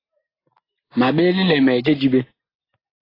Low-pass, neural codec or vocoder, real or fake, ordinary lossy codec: 5.4 kHz; none; real; AAC, 24 kbps